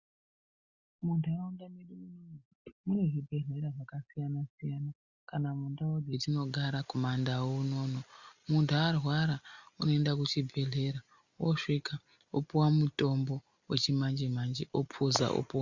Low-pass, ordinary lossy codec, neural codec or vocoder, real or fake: 7.2 kHz; Opus, 64 kbps; none; real